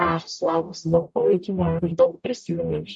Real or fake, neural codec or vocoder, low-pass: fake; codec, 44.1 kHz, 0.9 kbps, DAC; 10.8 kHz